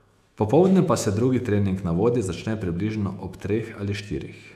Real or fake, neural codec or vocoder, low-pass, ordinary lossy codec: fake; autoencoder, 48 kHz, 128 numbers a frame, DAC-VAE, trained on Japanese speech; 14.4 kHz; none